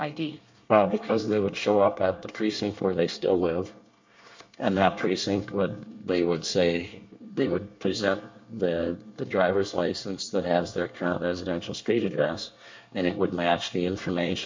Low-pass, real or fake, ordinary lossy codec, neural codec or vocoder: 7.2 kHz; fake; MP3, 48 kbps; codec, 24 kHz, 1 kbps, SNAC